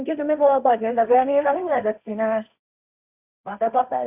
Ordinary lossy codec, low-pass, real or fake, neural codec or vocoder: none; 3.6 kHz; fake; codec, 16 kHz, 1.1 kbps, Voila-Tokenizer